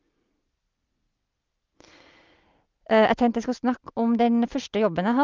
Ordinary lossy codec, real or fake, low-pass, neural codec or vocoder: Opus, 24 kbps; real; 7.2 kHz; none